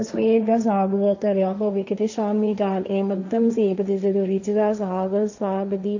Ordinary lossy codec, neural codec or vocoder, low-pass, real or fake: none; codec, 16 kHz, 1.1 kbps, Voila-Tokenizer; 7.2 kHz; fake